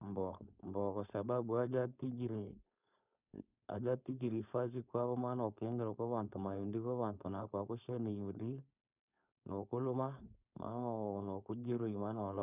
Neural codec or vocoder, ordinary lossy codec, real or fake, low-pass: codec, 16 kHz, 4.8 kbps, FACodec; none; fake; 3.6 kHz